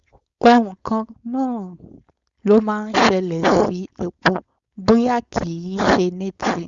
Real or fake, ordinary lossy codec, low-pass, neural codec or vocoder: fake; Opus, 64 kbps; 7.2 kHz; codec, 16 kHz, 4.8 kbps, FACodec